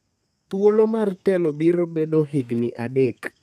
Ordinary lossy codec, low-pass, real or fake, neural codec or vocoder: none; 14.4 kHz; fake; codec, 32 kHz, 1.9 kbps, SNAC